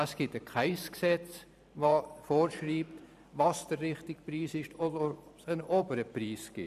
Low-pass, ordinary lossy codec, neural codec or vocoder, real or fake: 14.4 kHz; none; vocoder, 44.1 kHz, 128 mel bands every 512 samples, BigVGAN v2; fake